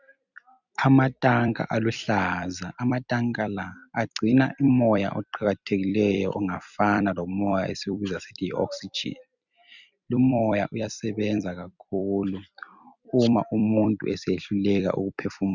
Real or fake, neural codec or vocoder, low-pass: fake; vocoder, 44.1 kHz, 128 mel bands every 256 samples, BigVGAN v2; 7.2 kHz